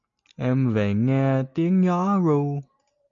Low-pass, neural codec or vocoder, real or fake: 7.2 kHz; none; real